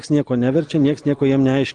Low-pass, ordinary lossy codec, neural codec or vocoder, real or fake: 9.9 kHz; Opus, 24 kbps; none; real